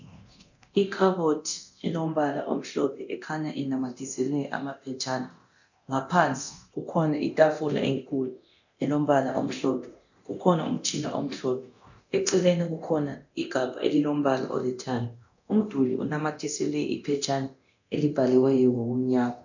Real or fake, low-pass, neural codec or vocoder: fake; 7.2 kHz; codec, 24 kHz, 0.9 kbps, DualCodec